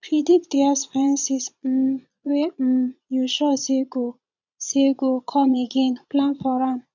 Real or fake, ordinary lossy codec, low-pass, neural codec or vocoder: fake; none; 7.2 kHz; vocoder, 22.05 kHz, 80 mel bands, Vocos